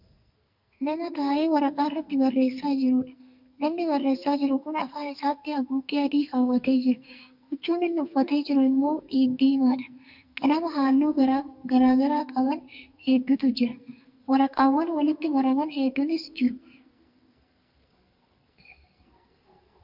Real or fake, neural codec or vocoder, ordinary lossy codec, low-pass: fake; codec, 44.1 kHz, 2.6 kbps, SNAC; MP3, 48 kbps; 5.4 kHz